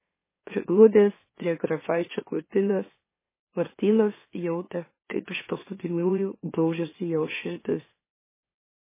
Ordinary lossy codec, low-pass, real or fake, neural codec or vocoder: MP3, 16 kbps; 3.6 kHz; fake; autoencoder, 44.1 kHz, a latent of 192 numbers a frame, MeloTTS